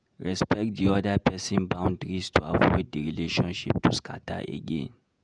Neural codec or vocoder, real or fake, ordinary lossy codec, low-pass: vocoder, 48 kHz, 128 mel bands, Vocos; fake; none; 9.9 kHz